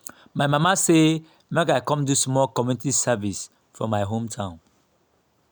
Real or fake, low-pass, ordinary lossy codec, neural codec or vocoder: real; none; none; none